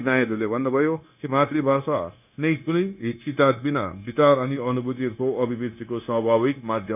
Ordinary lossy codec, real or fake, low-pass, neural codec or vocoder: none; fake; 3.6 kHz; codec, 16 kHz, 0.9 kbps, LongCat-Audio-Codec